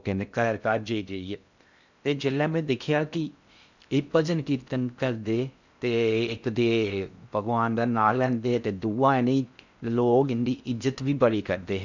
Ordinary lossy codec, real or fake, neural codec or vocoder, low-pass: none; fake; codec, 16 kHz in and 24 kHz out, 0.6 kbps, FocalCodec, streaming, 2048 codes; 7.2 kHz